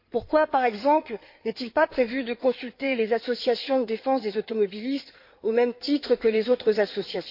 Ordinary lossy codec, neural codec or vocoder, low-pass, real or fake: MP3, 32 kbps; codec, 16 kHz in and 24 kHz out, 2.2 kbps, FireRedTTS-2 codec; 5.4 kHz; fake